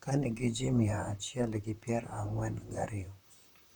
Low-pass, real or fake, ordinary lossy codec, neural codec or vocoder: 19.8 kHz; fake; none; vocoder, 44.1 kHz, 128 mel bands, Pupu-Vocoder